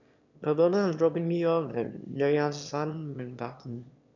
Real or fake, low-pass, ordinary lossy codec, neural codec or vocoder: fake; 7.2 kHz; none; autoencoder, 22.05 kHz, a latent of 192 numbers a frame, VITS, trained on one speaker